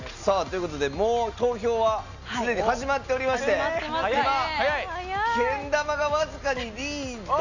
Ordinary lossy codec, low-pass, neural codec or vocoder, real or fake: none; 7.2 kHz; none; real